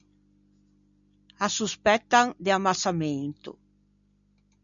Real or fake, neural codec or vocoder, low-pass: real; none; 7.2 kHz